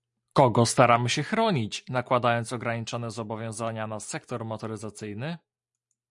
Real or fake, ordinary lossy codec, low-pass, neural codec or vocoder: real; MP3, 96 kbps; 10.8 kHz; none